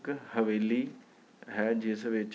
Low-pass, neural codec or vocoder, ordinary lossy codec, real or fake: none; none; none; real